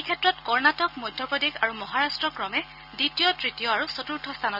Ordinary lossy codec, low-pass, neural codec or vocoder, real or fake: none; 5.4 kHz; none; real